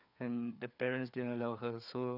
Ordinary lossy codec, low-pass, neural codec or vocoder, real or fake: none; 5.4 kHz; codec, 16 kHz, 4 kbps, FreqCodec, larger model; fake